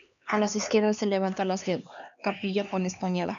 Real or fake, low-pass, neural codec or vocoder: fake; 7.2 kHz; codec, 16 kHz, 2 kbps, X-Codec, HuBERT features, trained on LibriSpeech